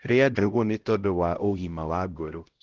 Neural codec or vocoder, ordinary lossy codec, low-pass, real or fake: codec, 16 kHz, 0.5 kbps, X-Codec, HuBERT features, trained on LibriSpeech; Opus, 16 kbps; 7.2 kHz; fake